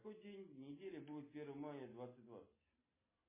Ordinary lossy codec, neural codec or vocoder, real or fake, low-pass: MP3, 16 kbps; none; real; 3.6 kHz